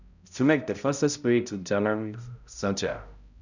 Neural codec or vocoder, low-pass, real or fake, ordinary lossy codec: codec, 16 kHz, 0.5 kbps, X-Codec, HuBERT features, trained on balanced general audio; 7.2 kHz; fake; none